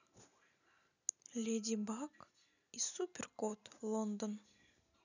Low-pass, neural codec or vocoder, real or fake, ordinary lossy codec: 7.2 kHz; none; real; none